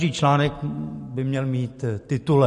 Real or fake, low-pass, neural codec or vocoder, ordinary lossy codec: real; 14.4 kHz; none; MP3, 48 kbps